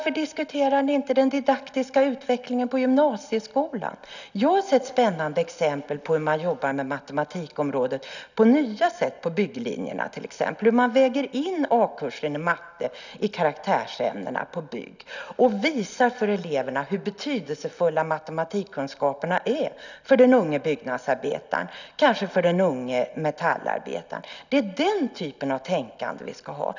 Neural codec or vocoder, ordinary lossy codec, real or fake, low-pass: none; none; real; 7.2 kHz